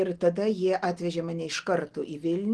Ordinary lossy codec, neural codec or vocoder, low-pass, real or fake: Opus, 16 kbps; none; 10.8 kHz; real